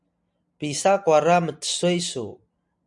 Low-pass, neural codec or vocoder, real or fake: 10.8 kHz; none; real